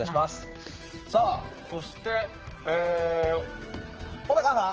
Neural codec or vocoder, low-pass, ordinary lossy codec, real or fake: codec, 16 kHz, 4 kbps, X-Codec, HuBERT features, trained on general audio; 7.2 kHz; Opus, 16 kbps; fake